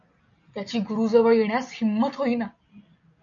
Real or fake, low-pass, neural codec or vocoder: real; 7.2 kHz; none